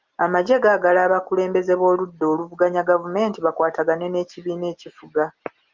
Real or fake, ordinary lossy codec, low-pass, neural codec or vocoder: real; Opus, 24 kbps; 7.2 kHz; none